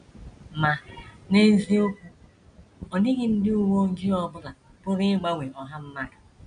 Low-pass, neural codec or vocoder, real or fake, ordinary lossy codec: 9.9 kHz; none; real; none